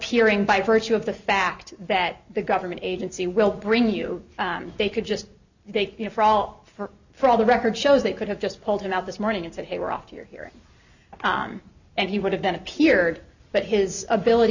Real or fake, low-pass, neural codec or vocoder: real; 7.2 kHz; none